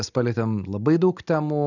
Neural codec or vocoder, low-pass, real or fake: none; 7.2 kHz; real